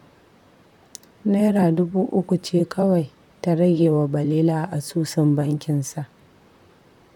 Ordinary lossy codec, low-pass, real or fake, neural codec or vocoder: none; 19.8 kHz; fake; vocoder, 44.1 kHz, 128 mel bands, Pupu-Vocoder